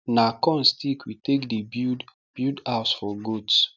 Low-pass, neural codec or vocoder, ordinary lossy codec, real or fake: 7.2 kHz; none; none; real